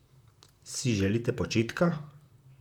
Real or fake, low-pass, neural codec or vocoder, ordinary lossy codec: fake; 19.8 kHz; vocoder, 44.1 kHz, 128 mel bands, Pupu-Vocoder; none